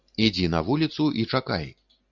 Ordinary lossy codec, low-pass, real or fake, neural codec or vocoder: Opus, 64 kbps; 7.2 kHz; real; none